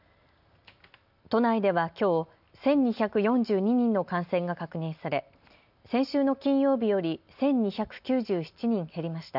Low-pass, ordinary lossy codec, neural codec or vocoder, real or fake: 5.4 kHz; none; none; real